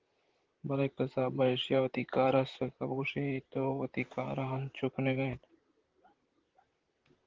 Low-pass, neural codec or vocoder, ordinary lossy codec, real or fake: 7.2 kHz; vocoder, 44.1 kHz, 128 mel bands, Pupu-Vocoder; Opus, 16 kbps; fake